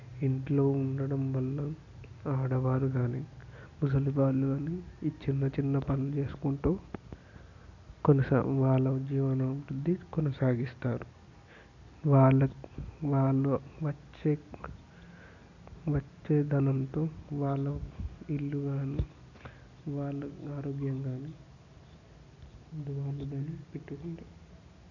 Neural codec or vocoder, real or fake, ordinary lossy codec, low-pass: none; real; none; 7.2 kHz